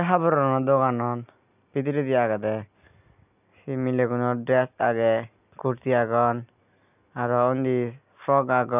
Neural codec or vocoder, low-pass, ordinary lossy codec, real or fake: none; 3.6 kHz; none; real